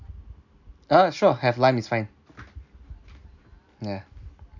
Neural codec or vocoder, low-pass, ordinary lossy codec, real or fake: none; 7.2 kHz; none; real